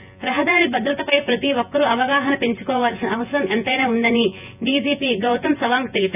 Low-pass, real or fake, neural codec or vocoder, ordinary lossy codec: 3.6 kHz; fake; vocoder, 24 kHz, 100 mel bands, Vocos; none